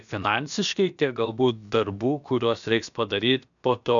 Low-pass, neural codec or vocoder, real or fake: 7.2 kHz; codec, 16 kHz, about 1 kbps, DyCAST, with the encoder's durations; fake